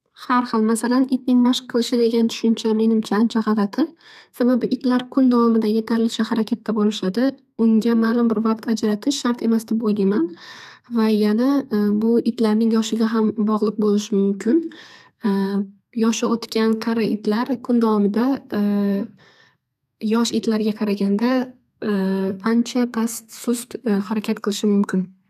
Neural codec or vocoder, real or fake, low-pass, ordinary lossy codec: codec, 32 kHz, 1.9 kbps, SNAC; fake; 14.4 kHz; none